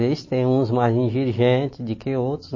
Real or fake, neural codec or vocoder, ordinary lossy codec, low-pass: real; none; MP3, 32 kbps; 7.2 kHz